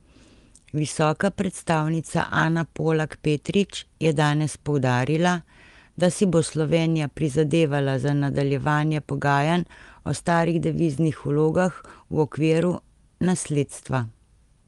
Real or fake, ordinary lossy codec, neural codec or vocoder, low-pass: real; Opus, 32 kbps; none; 10.8 kHz